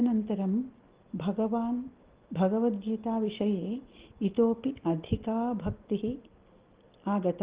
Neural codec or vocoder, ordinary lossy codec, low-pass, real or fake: none; Opus, 16 kbps; 3.6 kHz; real